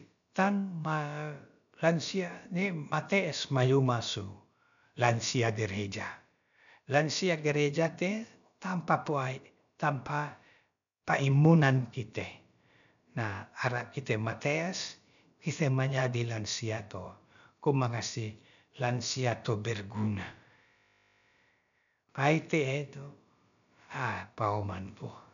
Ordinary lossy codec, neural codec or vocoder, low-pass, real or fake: none; codec, 16 kHz, about 1 kbps, DyCAST, with the encoder's durations; 7.2 kHz; fake